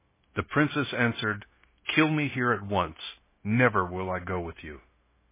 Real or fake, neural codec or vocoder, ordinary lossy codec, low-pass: fake; codec, 16 kHz, 0.9 kbps, LongCat-Audio-Codec; MP3, 16 kbps; 3.6 kHz